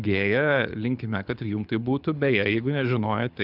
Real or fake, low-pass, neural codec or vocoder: fake; 5.4 kHz; codec, 24 kHz, 6 kbps, HILCodec